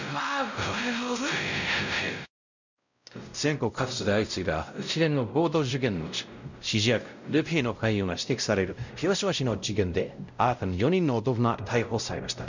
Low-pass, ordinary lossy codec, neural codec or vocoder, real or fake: 7.2 kHz; none; codec, 16 kHz, 0.5 kbps, X-Codec, WavLM features, trained on Multilingual LibriSpeech; fake